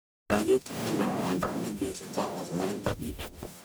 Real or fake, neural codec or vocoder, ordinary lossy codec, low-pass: fake; codec, 44.1 kHz, 0.9 kbps, DAC; none; none